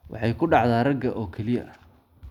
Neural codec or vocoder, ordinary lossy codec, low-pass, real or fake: none; none; 19.8 kHz; real